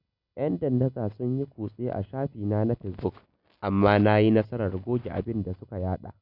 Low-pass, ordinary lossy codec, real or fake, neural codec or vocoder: 5.4 kHz; none; real; none